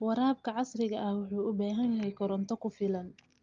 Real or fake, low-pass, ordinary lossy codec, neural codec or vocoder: real; 7.2 kHz; Opus, 32 kbps; none